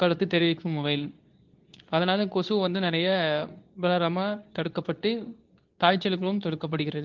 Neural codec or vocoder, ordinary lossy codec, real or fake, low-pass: codec, 24 kHz, 0.9 kbps, WavTokenizer, medium speech release version 2; Opus, 32 kbps; fake; 7.2 kHz